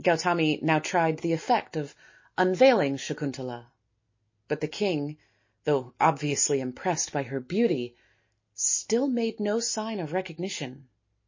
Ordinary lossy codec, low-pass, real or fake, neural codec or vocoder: MP3, 32 kbps; 7.2 kHz; real; none